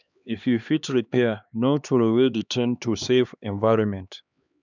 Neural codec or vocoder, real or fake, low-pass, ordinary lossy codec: codec, 16 kHz, 2 kbps, X-Codec, HuBERT features, trained on LibriSpeech; fake; 7.2 kHz; none